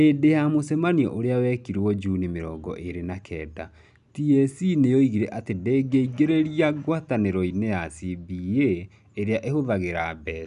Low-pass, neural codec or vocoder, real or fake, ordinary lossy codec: 10.8 kHz; none; real; none